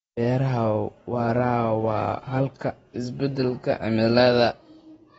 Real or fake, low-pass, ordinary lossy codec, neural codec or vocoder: fake; 19.8 kHz; AAC, 24 kbps; vocoder, 48 kHz, 128 mel bands, Vocos